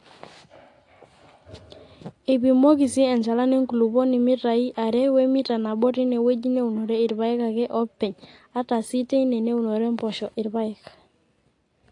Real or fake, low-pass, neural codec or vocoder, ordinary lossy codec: real; 10.8 kHz; none; AAC, 48 kbps